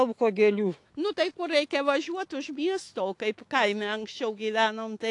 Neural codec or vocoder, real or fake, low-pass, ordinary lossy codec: autoencoder, 48 kHz, 128 numbers a frame, DAC-VAE, trained on Japanese speech; fake; 10.8 kHz; AAC, 48 kbps